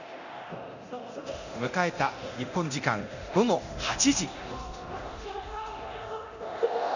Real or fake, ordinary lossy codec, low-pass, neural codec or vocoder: fake; none; 7.2 kHz; codec, 24 kHz, 0.9 kbps, DualCodec